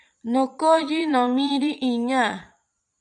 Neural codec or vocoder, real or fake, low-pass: vocoder, 22.05 kHz, 80 mel bands, Vocos; fake; 9.9 kHz